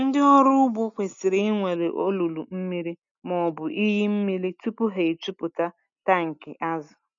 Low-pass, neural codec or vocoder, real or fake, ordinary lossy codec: 7.2 kHz; none; real; none